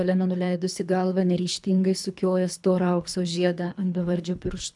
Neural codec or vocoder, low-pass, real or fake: codec, 24 kHz, 3 kbps, HILCodec; 10.8 kHz; fake